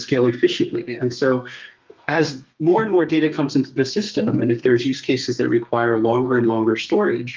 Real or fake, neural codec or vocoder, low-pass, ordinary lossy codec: fake; codec, 32 kHz, 1.9 kbps, SNAC; 7.2 kHz; Opus, 24 kbps